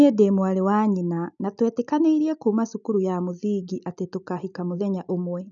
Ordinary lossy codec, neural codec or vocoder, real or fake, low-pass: none; none; real; 7.2 kHz